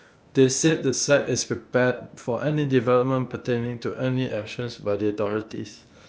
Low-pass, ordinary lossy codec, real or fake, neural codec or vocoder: none; none; fake; codec, 16 kHz, 0.8 kbps, ZipCodec